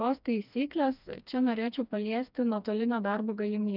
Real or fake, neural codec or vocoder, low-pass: fake; codec, 16 kHz, 2 kbps, FreqCodec, smaller model; 5.4 kHz